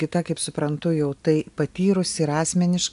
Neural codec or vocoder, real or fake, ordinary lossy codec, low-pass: none; real; AAC, 96 kbps; 10.8 kHz